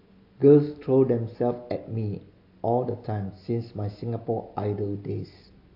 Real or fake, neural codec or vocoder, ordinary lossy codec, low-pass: real; none; AAC, 32 kbps; 5.4 kHz